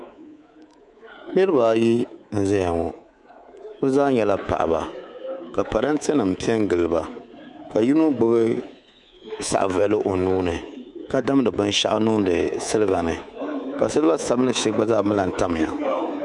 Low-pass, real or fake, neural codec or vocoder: 10.8 kHz; fake; codec, 24 kHz, 3.1 kbps, DualCodec